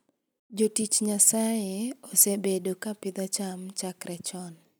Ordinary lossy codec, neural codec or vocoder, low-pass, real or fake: none; none; none; real